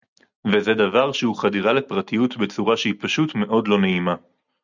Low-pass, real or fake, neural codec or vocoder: 7.2 kHz; real; none